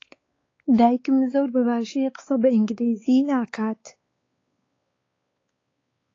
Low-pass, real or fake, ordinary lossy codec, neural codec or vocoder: 7.2 kHz; fake; AAC, 32 kbps; codec, 16 kHz, 4 kbps, X-Codec, WavLM features, trained on Multilingual LibriSpeech